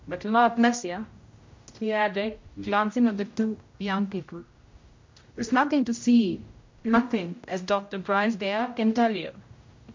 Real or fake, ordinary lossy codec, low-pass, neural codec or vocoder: fake; MP3, 48 kbps; 7.2 kHz; codec, 16 kHz, 0.5 kbps, X-Codec, HuBERT features, trained on general audio